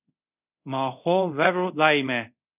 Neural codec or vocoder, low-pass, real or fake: codec, 24 kHz, 0.5 kbps, DualCodec; 3.6 kHz; fake